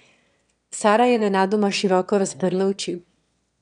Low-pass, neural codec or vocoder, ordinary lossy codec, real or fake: 9.9 kHz; autoencoder, 22.05 kHz, a latent of 192 numbers a frame, VITS, trained on one speaker; none; fake